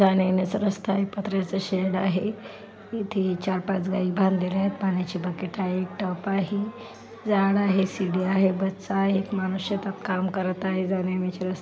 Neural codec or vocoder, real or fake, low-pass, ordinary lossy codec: none; real; none; none